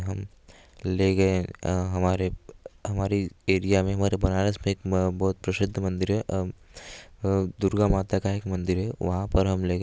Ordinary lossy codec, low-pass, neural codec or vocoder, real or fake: none; none; none; real